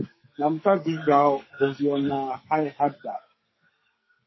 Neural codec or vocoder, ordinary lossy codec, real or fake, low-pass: codec, 44.1 kHz, 2.6 kbps, SNAC; MP3, 24 kbps; fake; 7.2 kHz